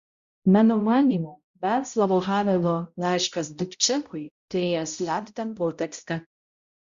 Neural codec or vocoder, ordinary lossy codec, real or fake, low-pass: codec, 16 kHz, 0.5 kbps, X-Codec, HuBERT features, trained on balanced general audio; Opus, 64 kbps; fake; 7.2 kHz